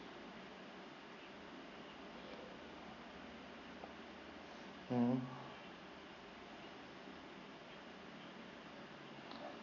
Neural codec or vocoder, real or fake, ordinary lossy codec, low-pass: none; real; none; 7.2 kHz